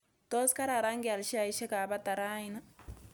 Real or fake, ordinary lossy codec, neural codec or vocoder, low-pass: real; none; none; none